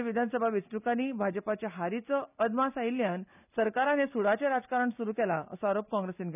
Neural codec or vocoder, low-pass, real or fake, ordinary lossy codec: none; 3.6 kHz; real; none